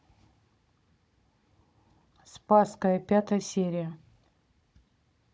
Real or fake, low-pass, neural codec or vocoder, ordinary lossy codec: fake; none; codec, 16 kHz, 16 kbps, FunCodec, trained on Chinese and English, 50 frames a second; none